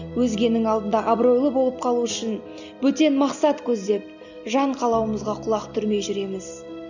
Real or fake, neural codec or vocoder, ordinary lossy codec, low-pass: real; none; none; 7.2 kHz